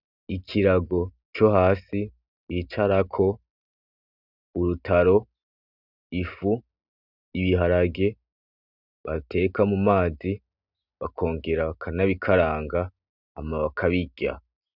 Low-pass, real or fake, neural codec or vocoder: 5.4 kHz; real; none